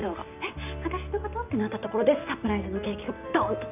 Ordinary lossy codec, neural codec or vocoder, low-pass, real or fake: none; none; 3.6 kHz; real